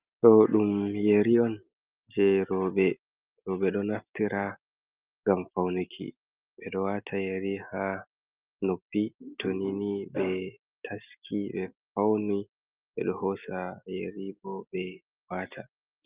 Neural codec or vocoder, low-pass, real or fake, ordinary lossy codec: none; 3.6 kHz; real; Opus, 32 kbps